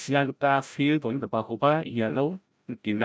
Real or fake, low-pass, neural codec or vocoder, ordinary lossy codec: fake; none; codec, 16 kHz, 0.5 kbps, FreqCodec, larger model; none